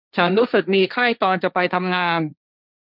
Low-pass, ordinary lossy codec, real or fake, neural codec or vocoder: 5.4 kHz; none; fake; codec, 16 kHz, 1.1 kbps, Voila-Tokenizer